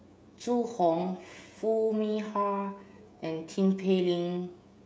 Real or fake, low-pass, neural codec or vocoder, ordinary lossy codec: fake; none; codec, 16 kHz, 16 kbps, FreqCodec, smaller model; none